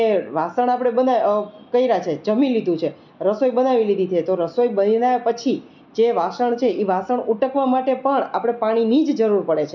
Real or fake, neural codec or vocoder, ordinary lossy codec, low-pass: real; none; none; 7.2 kHz